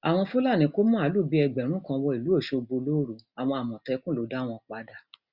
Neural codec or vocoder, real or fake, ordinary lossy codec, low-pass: none; real; Opus, 64 kbps; 5.4 kHz